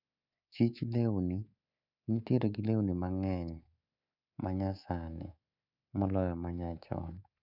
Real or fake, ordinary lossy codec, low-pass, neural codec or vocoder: fake; none; 5.4 kHz; codec, 24 kHz, 3.1 kbps, DualCodec